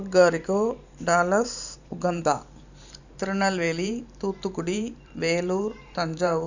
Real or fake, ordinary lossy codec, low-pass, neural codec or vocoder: fake; none; 7.2 kHz; vocoder, 44.1 kHz, 128 mel bands every 512 samples, BigVGAN v2